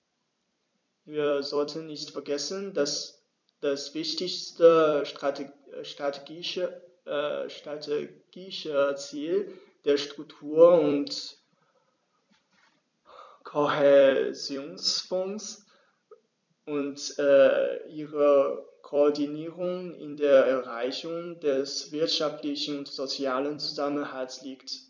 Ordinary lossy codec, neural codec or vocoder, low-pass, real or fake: none; vocoder, 44.1 kHz, 128 mel bands every 256 samples, BigVGAN v2; 7.2 kHz; fake